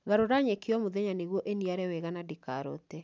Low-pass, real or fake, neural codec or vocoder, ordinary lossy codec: none; real; none; none